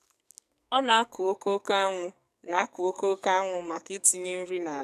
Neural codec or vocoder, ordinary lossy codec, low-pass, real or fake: codec, 44.1 kHz, 2.6 kbps, SNAC; none; 14.4 kHz; fake